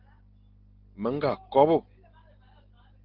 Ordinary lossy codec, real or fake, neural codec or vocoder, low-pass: Opus, 16 kbps; real; none; 5.4 kHz